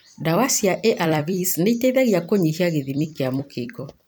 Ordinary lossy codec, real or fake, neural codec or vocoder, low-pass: none; fake; vocoder, 44.1 kHz, 128 mel bands every 256 samples, BigVGAN v2; none